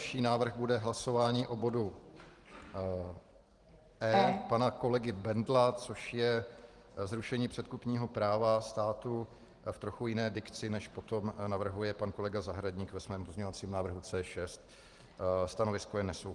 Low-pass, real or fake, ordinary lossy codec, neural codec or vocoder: 10.8 kHz; fake; Opus, 24 kbps; vocoder, 44.1 kHz, 128 mel bands every 512 samples, BigVGAN v2